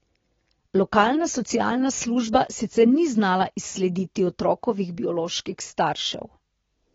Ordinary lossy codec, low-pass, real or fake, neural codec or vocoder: AAC, 24 kbps; 7.2 kHz; real; none